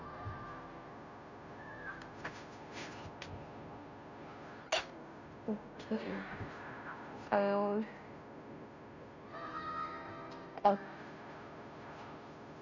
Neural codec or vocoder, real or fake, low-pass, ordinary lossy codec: codec, 16 kHz, 0.5 kbps, FunCodec, trained on Chinese and English, 25 frames a second; fake; 7.2 kHz; none